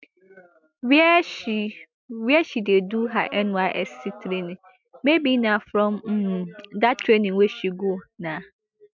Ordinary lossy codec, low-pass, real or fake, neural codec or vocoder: MP3, 64 kbps; 7.2 kHz; real; none